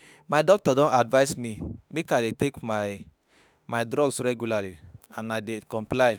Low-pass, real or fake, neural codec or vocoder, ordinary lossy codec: none; fake; autoencoder, 48 kHz, 32 numbers a frame, DAC-VAE, trained on Japanese speech; none